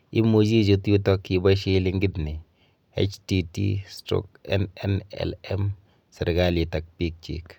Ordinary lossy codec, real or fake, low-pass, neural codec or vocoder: none; real; 19.8 kHz; none